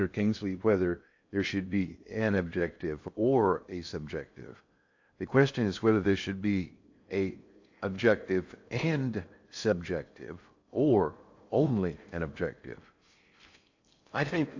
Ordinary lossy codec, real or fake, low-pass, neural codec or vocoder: AAC, 48 kbps; fake; 7.2 kHz; codec, 16 kHz in and 24 kHz out, 0.6 kbps, FocalCodec, streaming, 2048 codes